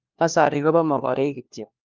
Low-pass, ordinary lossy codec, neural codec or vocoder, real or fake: 7.2 kHz; Opus, 32 kbps; codec, 16 kHz, 1 kbps, FunCodec, trained on LibriTTS, 50 frames a second; fake